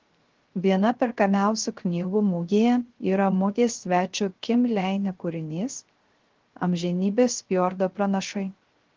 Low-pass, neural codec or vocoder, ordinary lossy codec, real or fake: 7.2 kHz; codec, 16 kHz, 0.3 kbps, FocalCodec; Opus, 16 kbps; fake